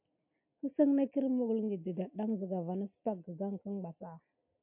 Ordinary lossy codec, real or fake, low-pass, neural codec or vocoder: MP3, 32 kbps; real; 3.6 kHz; none